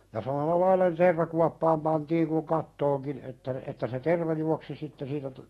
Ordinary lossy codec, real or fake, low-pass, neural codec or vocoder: AAC, 32 kbps; fake; 19.8 kHz; autoencoder, 48 kHz, 128 numbers a frame, DAC-VAE, trained on Japanese speech